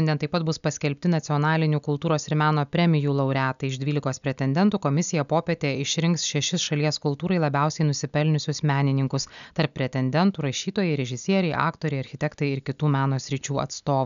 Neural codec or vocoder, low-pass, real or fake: none; 7.2 kHz; real